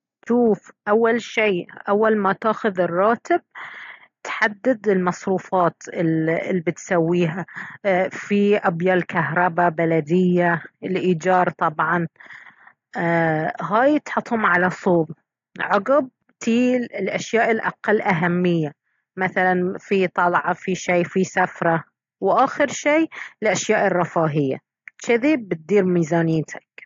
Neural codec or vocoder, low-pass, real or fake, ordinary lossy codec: none; 7.2 kHz; real; AAC, 48 kbps